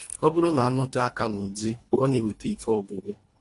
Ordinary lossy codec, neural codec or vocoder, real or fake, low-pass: AAC, 48 kbps; codec, 24 kHz, 1.5 kbps, HILCodec; fake; 10.8 kHz